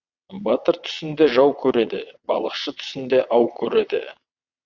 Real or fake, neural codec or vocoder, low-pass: fake; vocoder, 22.05 kHz, 80 mel bands, WaveNeXt; 7.2 kHz